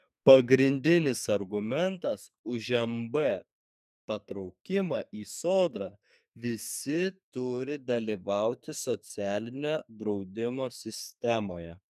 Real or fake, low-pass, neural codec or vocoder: fake; 14.4 kHz; codec, 44.1 kHz, 2.6 kbps, SNAC